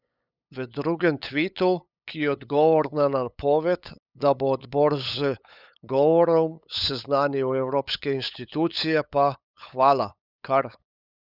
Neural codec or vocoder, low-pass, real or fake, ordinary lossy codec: codec, 16 kHz, 8 kbps, FunCodec, trained on LibriTTS, 25 frames a second; 5.4 kHz; fake; none